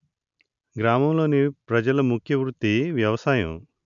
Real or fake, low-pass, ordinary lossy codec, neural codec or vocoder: real; 7.2 kHz; none; none